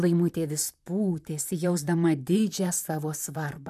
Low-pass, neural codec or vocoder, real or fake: 14.4 kHz; vocoder, 44.1 kHz, 128 mel bands, Pupu-Vocoder; fake